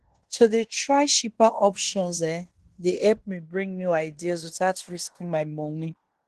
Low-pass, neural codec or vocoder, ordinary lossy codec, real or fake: 10.8 kHz; codec, 16 kHz in and 24 kHz out, 0.9 kbps, LongCat-Audio-Codec, fine tuned four codebook decoder; Opus, 16 kbps; fake